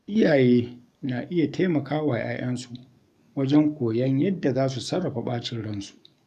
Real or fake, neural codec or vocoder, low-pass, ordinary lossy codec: fake; codec, 44.1 kHz, 7.8 kbps, DAC; 14.4 kHz; none